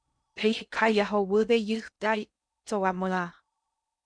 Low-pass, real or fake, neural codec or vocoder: 9.9 kHz; fake; codec, 16 kHz in and 24 kHz out, 0.6 kbps, FocalCodec, streaming, 2048 codes